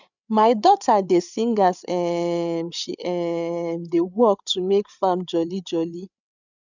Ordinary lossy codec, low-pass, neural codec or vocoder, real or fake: none; 7.2 kHz; codec, 16 kHz, 8 kbps, FreqCodec, larger model; fake